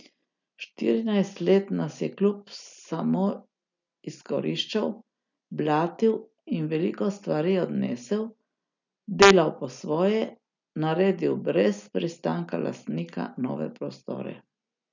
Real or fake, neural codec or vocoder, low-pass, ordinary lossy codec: real; none; 7.2 kHz; none